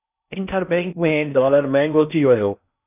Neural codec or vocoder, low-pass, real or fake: codec, 16 kHz in and 24 kHz out, 0.6 kbps, FocalCodec, streaming, 4096 codes; 3.6 kHz; fake